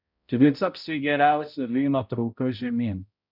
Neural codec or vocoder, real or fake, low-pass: codec, 16 kHz, 0.5 kbps, X-Codec, HuBERT features, trained on balanced general audio; fake; 5.4 kHz